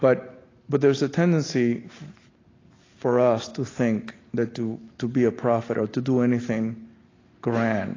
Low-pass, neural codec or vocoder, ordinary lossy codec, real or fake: 7.2 kHz; none; AAC, 32 kbps; real